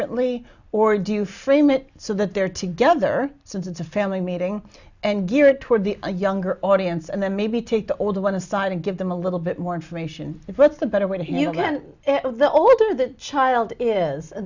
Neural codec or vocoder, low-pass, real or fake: none; 7.2 kHz; real